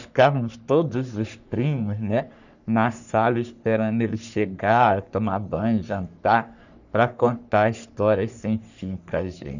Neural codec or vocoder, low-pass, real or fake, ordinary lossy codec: codec, 44.1 kHz, 3.4 kbps, Pupu-Codec; 7.2 kHz; fake; none